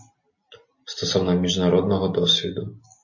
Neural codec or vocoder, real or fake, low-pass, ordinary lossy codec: none; real; 7.2 kHz; MP3, 32 kbps